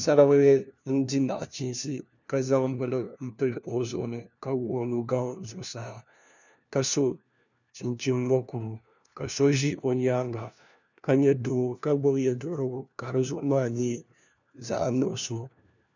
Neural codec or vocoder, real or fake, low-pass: codec, 16 kHz, 1 kbps, FunCodec, trained on LibriTTS, 50 frames a second; fake; 7.2 kHz